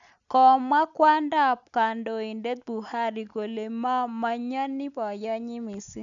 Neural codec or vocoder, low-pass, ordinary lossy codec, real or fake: none; 7.2 kHz; none; real